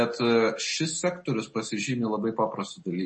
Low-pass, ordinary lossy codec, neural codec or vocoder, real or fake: 9.9 kHz; MP3, 32 kbps; none; real